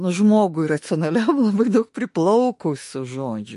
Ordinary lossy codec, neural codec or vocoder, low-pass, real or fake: MP3, 48 kbps; autoencoder, 48 kHz, 32 numbers a frame, DAC-VAE, trained on Japanese speech; 14.4 kHz; fake